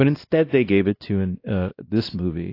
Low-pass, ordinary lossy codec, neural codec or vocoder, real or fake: 5.4 kHz; AAC, 32 kbps; codec, 16 kHz, 1 kbps, X-Codec, WavLM features, trained on Multilingual LibriSpeech; fake